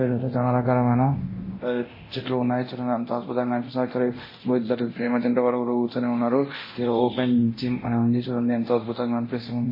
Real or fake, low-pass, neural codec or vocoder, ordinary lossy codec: fake; 5.4 kHz; codec, 24 kHz, 0.9 kbps, DualCodec; MP3, 24 kbps